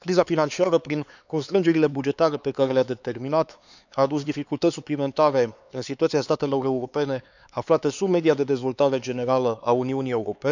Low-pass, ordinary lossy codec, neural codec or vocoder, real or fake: 7.2 kHz; none; codec, 16 kHz, 4 kbps, X-Codec, HuBERT features, trained on LibriSpeech; fake